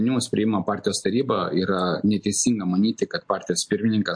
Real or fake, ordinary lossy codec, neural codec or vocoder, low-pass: real; MP3, 48 kbps; none; 9.9 kHz